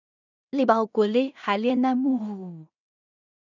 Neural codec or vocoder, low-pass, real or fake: codec, 16 kHz in and 24 kHz out, 0.4 kbps, LongCat-Audio-Codec, two codebook decoder; 7.2 kHz; fake